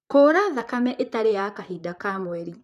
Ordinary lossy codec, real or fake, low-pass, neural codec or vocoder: none; fake; 14.4 kHz; vocoder, 44.1 kHz, 128 mel bands, Pupu-Vocoder